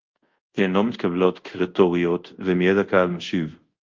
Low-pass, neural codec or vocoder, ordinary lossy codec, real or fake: 7.2 kHz; codec, 24 kHz, 0.5 kbps, DualCodec; Opus, 32 kbps; fake